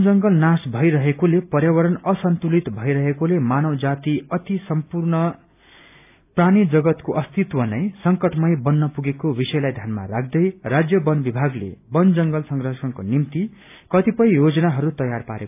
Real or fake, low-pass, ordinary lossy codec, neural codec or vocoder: real; 3.6 kHz; AAC, 32 kbps; none